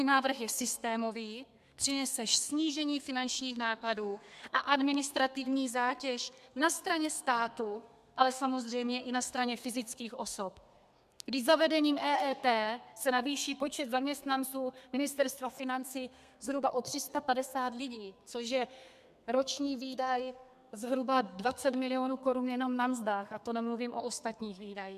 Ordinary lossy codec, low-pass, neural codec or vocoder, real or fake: MP3, 96 kbps; 14.4 kHz; codec, 32 kHz, 1.9 kbps, SNAC; fake